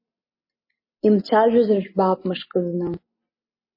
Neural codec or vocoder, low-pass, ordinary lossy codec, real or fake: none; 5.4 kHz; MP3, 24 kbps; real